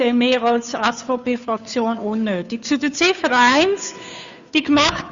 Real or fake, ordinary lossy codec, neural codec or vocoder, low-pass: fake; Opus, 64 kbps; codec, 16 kHz, 2 kbps, FunCodec, trained on Chinese and English, 25 frames a second; 7.2 kHz